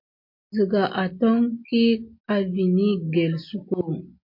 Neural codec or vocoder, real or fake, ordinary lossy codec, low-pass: none; real; MP3, 32 kbps; 5.4 kHz